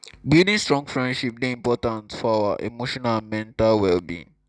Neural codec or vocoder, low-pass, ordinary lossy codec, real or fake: none; none; none; real